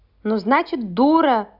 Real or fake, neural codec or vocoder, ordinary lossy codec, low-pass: real; none; Opus, 64 kbps; 5.4 kHz